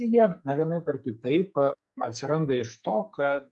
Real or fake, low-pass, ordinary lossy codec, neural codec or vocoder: fake; 10.8 kHz; MP3, 64 kbps; codec, 44.1 kHz, 2.6 kbps, SNAC